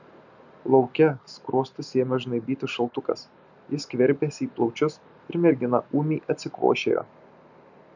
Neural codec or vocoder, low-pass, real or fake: none; 7.2 kHz; real